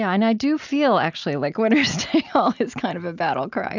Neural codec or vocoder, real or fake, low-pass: none; real; 7.2 kHz